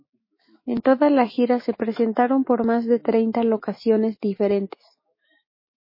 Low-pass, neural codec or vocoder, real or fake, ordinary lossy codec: 5.4 kHz; autoencoder, 48 kHz, 128 numbers a frame, DAC-VAE, trained on Japanese speech; fake; MP3, 24 kbps